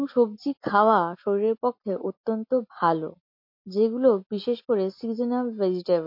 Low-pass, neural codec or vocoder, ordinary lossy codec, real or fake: 5.4 kHz; none; MP3, 32 kbps; real